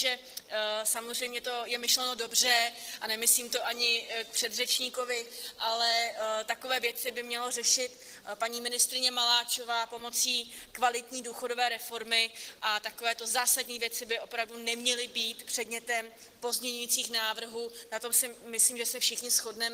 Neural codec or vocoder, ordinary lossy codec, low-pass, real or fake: none; Opus, 16 kbps; 14.4 kHz; real